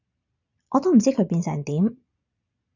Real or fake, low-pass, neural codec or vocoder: fake; 7.2 kHz; vocoder, 22.05 kHz, 80 mel bands, Vocos